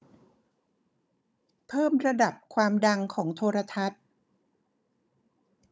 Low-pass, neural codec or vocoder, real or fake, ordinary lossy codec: none; codec, 16 kHz, 16 kbps, FunCodec, trained on Chinese and English, 50 frames a second; fake; none